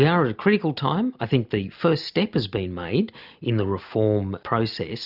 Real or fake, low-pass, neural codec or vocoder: real; 5.4 kHz; none